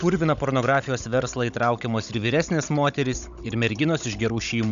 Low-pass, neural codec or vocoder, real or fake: 7.2 kHz; codec, 16 kHz, 16 kbps, FunCodec, trained on Chinese and English, 50 frames a second; fake